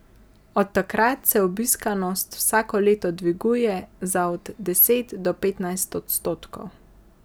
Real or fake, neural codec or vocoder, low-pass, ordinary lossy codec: real; none; none; none